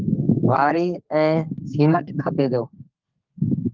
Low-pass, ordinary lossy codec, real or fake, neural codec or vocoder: 7.2 kHz; Opus, 32 kbps; fake; codec, 32 kHz, 1.9 kbps, SNAC